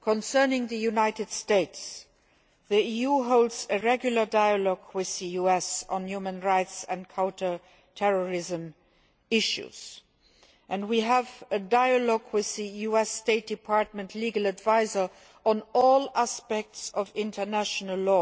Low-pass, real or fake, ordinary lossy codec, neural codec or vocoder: none; real; none; none